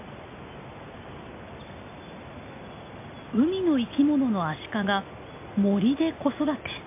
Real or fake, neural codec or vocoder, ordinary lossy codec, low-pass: real; none; MP3, 24 kbps; 3.6 kHz